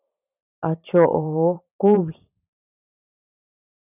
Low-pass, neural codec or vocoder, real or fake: 3.6 kHz; vocoder, 44.1 kHz, 128 mel bands every 512 samples, BigVGAN v2; fake